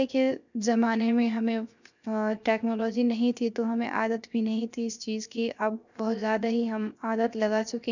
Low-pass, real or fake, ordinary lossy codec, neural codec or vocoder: 7.2 kHz; fake; none; codec, 16 kHz, 0.7 kbps, FocalCodec